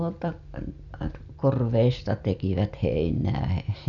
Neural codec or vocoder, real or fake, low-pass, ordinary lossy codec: none; real; 7.2 kHz; none